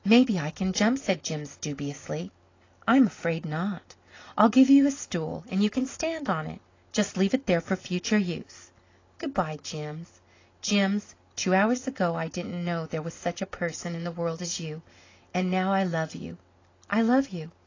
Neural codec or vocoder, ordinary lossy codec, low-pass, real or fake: none; AAC, 32 kbps; 7.2 kHz; real